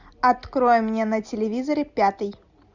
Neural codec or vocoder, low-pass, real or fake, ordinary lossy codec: none; 7.2 kHz; real; Opus, 64 kbps